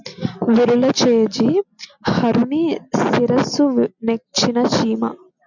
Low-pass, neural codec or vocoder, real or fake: 7.2 kHz; none; real